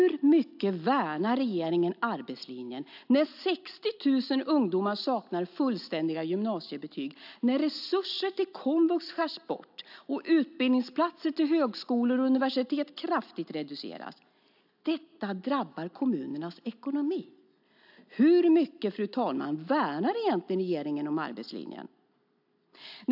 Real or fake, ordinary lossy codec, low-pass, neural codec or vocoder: real; AAC, 48 kbps; 5.4 kHz; none